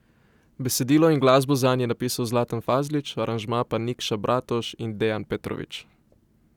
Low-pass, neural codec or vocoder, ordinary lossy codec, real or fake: 19.8 kHz; none; none; real